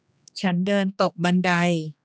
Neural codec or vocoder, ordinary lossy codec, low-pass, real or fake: codec, 16 kHz, 2 kbps, X-Codec, HuBERT features, trained on general audio; none; none; fake